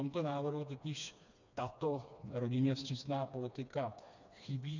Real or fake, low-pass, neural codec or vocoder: fake; 7.2 kHz; codec, 16 kHz, 2 kbps, FreqCodec, smaller model